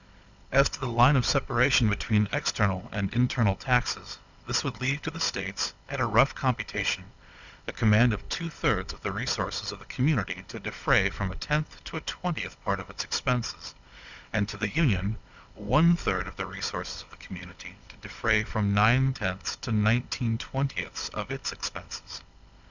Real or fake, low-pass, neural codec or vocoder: fake; 7.2 kHz; codec, 16 kHz, 16 kbps, FunCodec, trained on Chinese and English, 50 frames a second